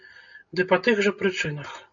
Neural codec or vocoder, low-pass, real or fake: none; 7.2 kHz; real